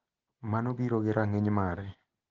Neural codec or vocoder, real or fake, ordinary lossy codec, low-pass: none; real; Opus, 16 kbps; 7.2 kHz